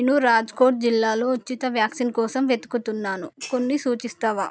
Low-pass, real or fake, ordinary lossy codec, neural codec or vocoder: none; real; none; none